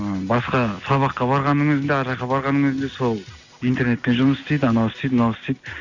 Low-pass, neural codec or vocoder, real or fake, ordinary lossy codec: 7.2 kHz; none; real; none